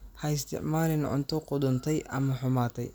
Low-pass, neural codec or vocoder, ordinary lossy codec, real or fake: none; none; none; real